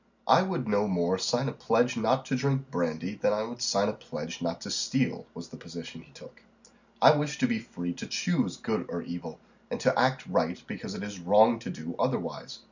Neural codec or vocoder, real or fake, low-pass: none; real; 7.2 kHz